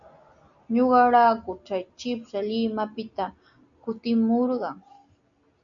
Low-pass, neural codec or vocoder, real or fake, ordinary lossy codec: 7.2 kHz; none; real; MP3, 96 kbps